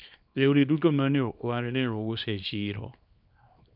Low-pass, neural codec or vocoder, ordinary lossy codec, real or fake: 5.4 kHz; codec, 24 kHz, 0.9 kbps, WavTokenizer, small release; none; fake